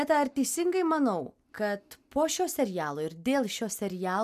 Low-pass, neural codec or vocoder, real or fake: 14.4 kHz; none; real